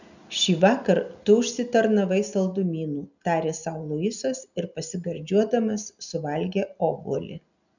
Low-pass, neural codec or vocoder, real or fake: 7.2 kHz; none; real